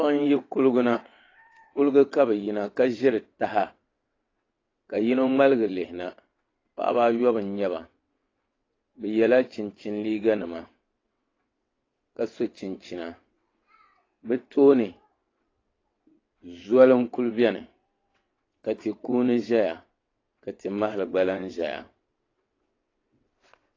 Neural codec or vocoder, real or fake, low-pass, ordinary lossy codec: vocoder, 22.05 kHz, 80 mel bands, WaveNeXt; fake; 7.2 kHz; AAC, 32 kbps